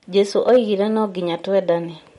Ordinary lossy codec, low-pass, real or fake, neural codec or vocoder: MP3, 48 kbps; 19.8 kHz; real; none